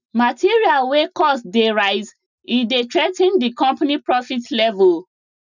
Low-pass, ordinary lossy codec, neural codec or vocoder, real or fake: 7.2 kHz; none; none; real